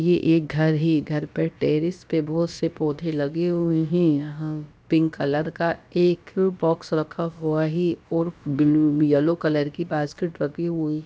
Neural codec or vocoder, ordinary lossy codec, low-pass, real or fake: codec, 16 kHz, about 1 kbps, DyCAST, with the encoder's durations; none; none; fake